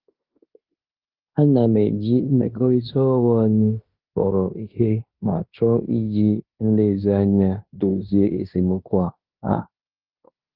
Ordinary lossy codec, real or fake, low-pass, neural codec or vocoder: Opus, 32 kbps; fake; 5.4 kHz; codec, 16 kHz in and 24 kHz out, 0.9 kbps, LongCat-Audio-Codec, fine tuned four codebook decoder